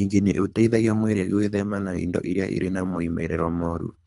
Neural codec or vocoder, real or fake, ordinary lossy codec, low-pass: codec, 24 kHz, 3 kbps, HILCodec; fake; none; 10.8 kHz